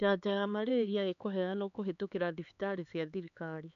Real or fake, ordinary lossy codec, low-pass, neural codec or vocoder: fake; none; 7.2 kHz; codec, 16 kHz, 4 kbps, X-Codec, HuBERT features, trained on LibriSpeech